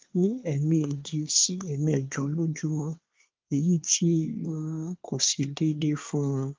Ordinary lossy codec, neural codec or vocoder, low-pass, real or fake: Opus, 32 kbps; codec, 24 kHz, 1 kbps, SNAC; 7.2 kHz; fake